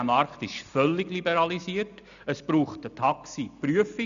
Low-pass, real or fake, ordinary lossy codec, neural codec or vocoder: 7.2 kHz; real; none; none